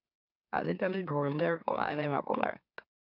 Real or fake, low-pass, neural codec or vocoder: fake; 5.4 kHz; autoencoder, 44.1 kHz, a latent of 192 numbers a frame, MeloTTS